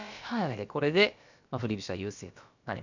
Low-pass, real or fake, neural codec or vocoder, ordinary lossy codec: 7.2 kHz; fake; codec, 16 kHz, about 1 kbps, DyCAST, with the encoder's durations; none